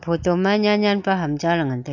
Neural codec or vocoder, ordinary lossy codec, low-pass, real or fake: none; none; 7.2 kHz; real